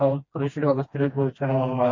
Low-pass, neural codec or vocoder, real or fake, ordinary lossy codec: 7.2 kHz; codec, 16 kHz, 1 kbps, FreqCodec, smaller model; fake; MP3, 32 kbps